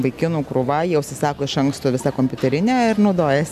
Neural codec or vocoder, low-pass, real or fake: none; 14.4 kHz; real